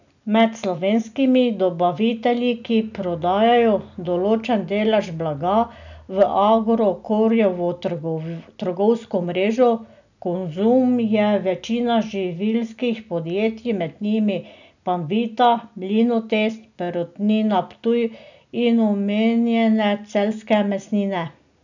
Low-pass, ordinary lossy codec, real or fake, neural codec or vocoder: 7.2 kHz; none; real; none